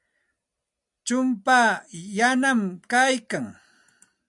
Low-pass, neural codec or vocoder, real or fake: 10.8 kHz; none; real